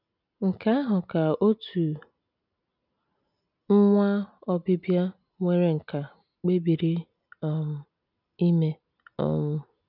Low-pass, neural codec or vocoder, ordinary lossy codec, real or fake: 5.4 kHz; none; none; real